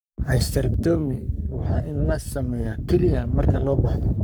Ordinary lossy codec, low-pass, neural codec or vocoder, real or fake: none; none; codec, 44.1 kHz, 3.4 kbps, Pupu-Codec; fake